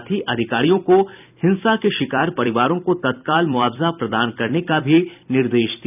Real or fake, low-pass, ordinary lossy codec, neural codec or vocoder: fake; 3.6 kHz; none; vocoder, 44.1 kHz, 128 mel bands every 256 samples, BigVGAN v2